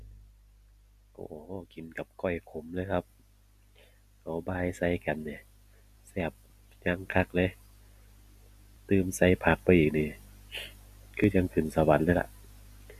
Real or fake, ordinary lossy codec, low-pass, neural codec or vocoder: real; none; 14.4 kHz; none